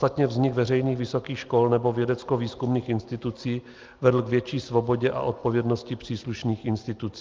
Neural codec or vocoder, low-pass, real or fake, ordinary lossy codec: none; 7.2 kHz; real; Opus, 32 kbps